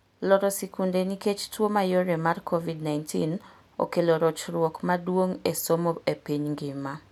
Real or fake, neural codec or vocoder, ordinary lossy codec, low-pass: real; none; none; 19.8 kHz